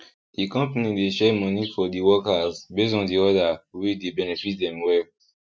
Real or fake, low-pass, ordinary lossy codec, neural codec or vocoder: real; none; none; none